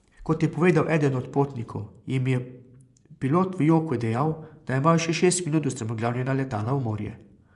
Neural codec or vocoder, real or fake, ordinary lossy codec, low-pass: vocoder, 24 kHz, 100 mel bands, Vocos; fake; none; 10.8 kHz